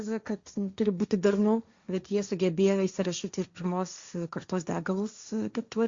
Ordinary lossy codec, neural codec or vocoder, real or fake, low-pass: Opus, 64 kbps; codec, 16 kHz, 1.1 kbps, Voila-Tokenizer; fake; 7.2 kHz